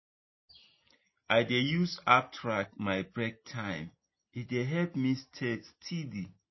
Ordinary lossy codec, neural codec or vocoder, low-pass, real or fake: MP3, 24 kbps; none; 7.2 kHz; real